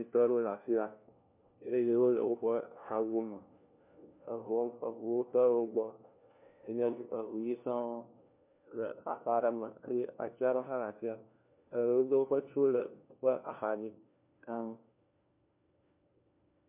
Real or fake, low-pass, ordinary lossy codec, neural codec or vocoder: fake; 3.6 kHz; MP3, 32 kbps; codec, 16 kHz, 1 kbps, FunCodec, trained on LibriTTS, 50 frames a second